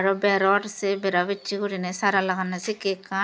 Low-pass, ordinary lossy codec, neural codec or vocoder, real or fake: none; none; none; real